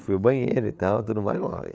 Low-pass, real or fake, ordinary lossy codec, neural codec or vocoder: none; fake; none; codec, 16 kHz, 8 kbps, FreqCodec, larger model